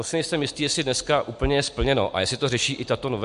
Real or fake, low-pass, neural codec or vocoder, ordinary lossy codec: real; 10.8 kHz; none; MP3, 64 kbps